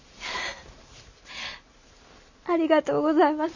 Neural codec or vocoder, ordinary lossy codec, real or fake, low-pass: none; none; real; 7.2 kHz